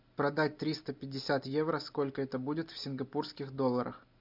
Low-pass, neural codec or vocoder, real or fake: 5.4 kHz; none; real